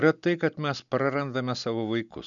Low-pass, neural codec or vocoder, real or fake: 7.2 kHz; none; real